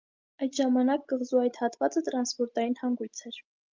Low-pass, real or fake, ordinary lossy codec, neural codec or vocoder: 7.2 kHz; real; Opus, 24 kbps; none